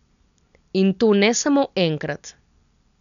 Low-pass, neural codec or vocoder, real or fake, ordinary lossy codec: 7.2 kHz; none; real; none